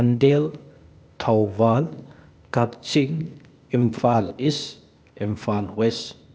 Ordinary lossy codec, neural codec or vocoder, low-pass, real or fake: none; codec, 16 kHz, 0.8 kbps, ZipCodec; none; fake